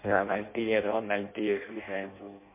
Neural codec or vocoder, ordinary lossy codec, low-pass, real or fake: codec, 16 kHz in and 24 kHz out, 0.6 kbps, FireRedTTS-2 codec; MP3, 24 kbps; 3.6 kHz; fake